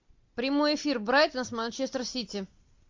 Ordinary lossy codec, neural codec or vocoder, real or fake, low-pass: MP3, 48 kbps; none; real; 7.2 kHz